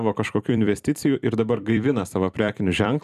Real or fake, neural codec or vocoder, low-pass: fake; vocoder, 44.1 kHz, 128 mel bands every 256 samples, BigVGAN v2; 14.4 kHz